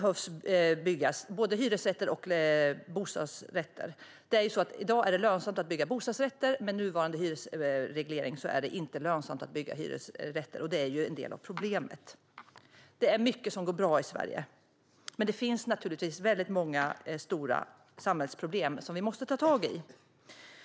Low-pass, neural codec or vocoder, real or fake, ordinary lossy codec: none; none; real; none